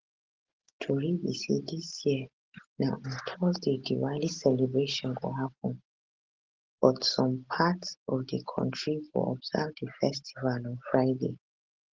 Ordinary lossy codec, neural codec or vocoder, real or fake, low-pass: Opus, 16 kbps; none; real; 7.2 kHz